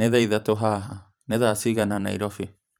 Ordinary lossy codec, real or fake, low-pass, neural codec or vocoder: none; fake; none; vocoder, 44.1 kHz, 128 mel bands every 512 samples, BigVGAN v2